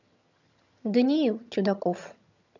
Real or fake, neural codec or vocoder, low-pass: fake; vocoder, 22.05 kHz, 80 mel bands, HiFi-GAN; 7.2 kHz